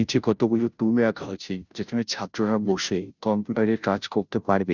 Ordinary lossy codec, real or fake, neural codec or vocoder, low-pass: none; fake; codec, 16 kHz, 0.5 kbps, FunCodec, trained on Chinese and English, 25 frames a second; 7.2 kHz